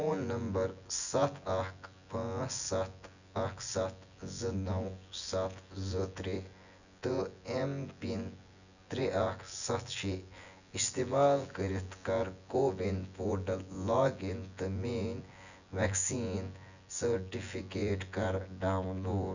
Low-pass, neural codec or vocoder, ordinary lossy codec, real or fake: 7.2 kHz; vocoder, 24 kHz, 100 mel bands, Vocos; none; fake